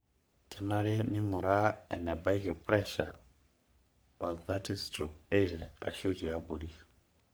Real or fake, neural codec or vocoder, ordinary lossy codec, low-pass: fake; codec, 44.1 kHz, 3.4 kbps, Pupu-Codec; none; none